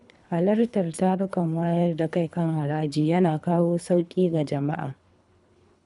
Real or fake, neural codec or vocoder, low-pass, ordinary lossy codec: fake; codec, 24 kHz, 3 kbps, HILCodec; 10.8 kHz; none